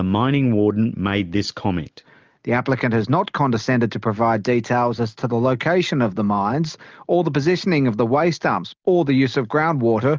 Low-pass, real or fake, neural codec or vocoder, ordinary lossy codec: 7.2 kHz; real; none; Opus, 24 kbps